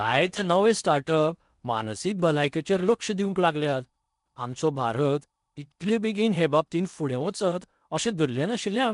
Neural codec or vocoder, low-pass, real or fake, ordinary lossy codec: codec, 16 kHz in and 24 kHz out, 0.8 kbps, FocalCodec, streaming, 65536 codes; 10.8 kHz; fake; none